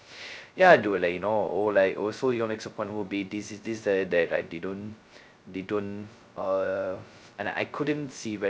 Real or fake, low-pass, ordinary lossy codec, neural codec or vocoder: fake; none; none; codec, 16 kHz, 0.2 kbps, FocalCodec